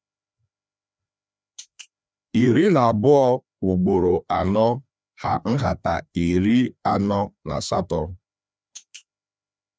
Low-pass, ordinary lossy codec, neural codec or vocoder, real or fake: none; none; codec, 16 kHz, 2 kbps, FreqCodec, larger model; fake